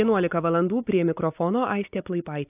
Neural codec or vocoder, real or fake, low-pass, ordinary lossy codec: none; real; 3.6 kHz; AAC, 32 kbps